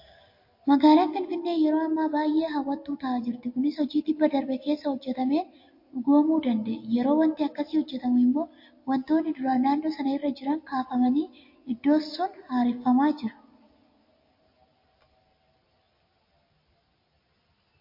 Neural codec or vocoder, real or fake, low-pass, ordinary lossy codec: none; real; 5.4 kHz; MP3, 32 kbps